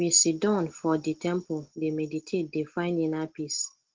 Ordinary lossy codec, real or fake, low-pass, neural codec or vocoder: Opus, 16 kbps; real; 7.2 kHz; none